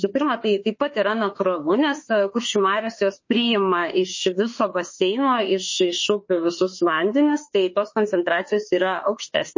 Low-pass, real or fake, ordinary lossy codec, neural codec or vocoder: 7.2 kHz; fake; MP3, 32 kbps; autoencoder, 48 kHz, 32 numbers a frame, DAC-VAE, trained on Japanese speech